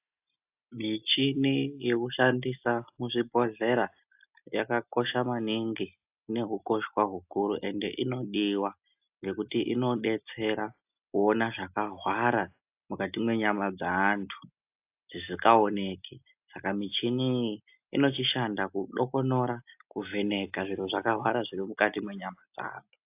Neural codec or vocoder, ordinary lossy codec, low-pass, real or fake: none; AAC, 32 kbps; 3.6 kHz; real